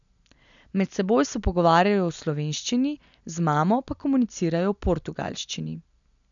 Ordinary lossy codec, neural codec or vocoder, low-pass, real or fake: none; none; 7.2 kHz; real